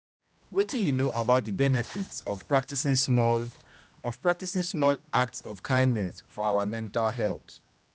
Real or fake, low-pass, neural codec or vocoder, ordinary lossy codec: fake; none; codec, 16 kHz, 1 kbps, X-Codec, HuBERT features, trained on general audio; none